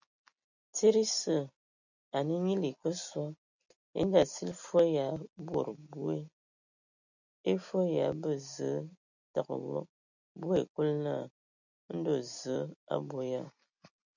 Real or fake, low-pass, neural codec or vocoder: real; 7.2 kHz; none